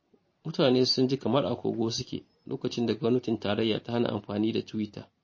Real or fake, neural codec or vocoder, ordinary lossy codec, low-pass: fake; vocoder, 44.1 kHz, 128 mel bands every 512 samples, BigVGAN v2; MP3, 32 kbps; 7.2 kHz